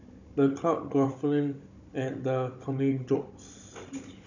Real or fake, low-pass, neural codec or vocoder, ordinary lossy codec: fake; 7.2 kHz; codec, 16 kHz, 16 kbps, FunCodec, trained on Chinese and English, 50 frames a second; none